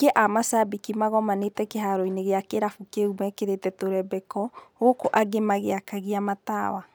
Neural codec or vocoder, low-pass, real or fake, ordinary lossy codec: none; none; real; none